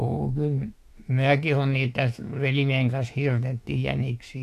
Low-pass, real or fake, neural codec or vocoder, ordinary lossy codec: 14.4 kHz; fake; autoencoder, 48 kHz, 32 numbers a frame, DAC-VAE, trained on Japanese speech; AAC, 64 kbps